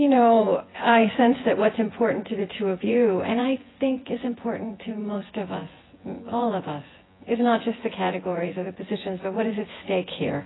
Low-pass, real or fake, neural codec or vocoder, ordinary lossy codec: 7.2 kHz; fake; vocoder, 24 kHz, 100 mel bands, Vocos; AAC, 16 kbps